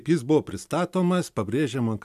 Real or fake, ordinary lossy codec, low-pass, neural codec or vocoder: real; MP3, 96 kbps; 14.4 kHz; none